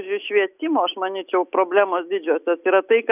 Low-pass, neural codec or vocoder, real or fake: 3.6 kHz; none; real